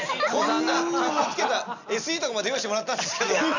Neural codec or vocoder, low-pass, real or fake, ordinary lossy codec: none; 7.2 kHz; real; none